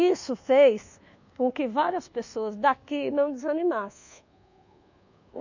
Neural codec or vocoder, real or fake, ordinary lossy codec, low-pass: codec, 24 kHz, 1.2 kbps, DualCodec; fake; none; 7.2 kHz